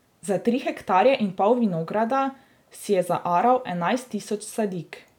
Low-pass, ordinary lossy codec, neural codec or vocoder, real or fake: 19.8 kHz; none; none; real